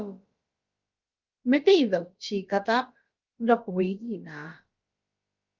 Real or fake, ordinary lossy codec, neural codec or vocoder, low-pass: fake; Opus, 24 kbps; codec, 16 kHz, about 1 kbps, DyCAST, with the encoder's durations; 7.2 kHz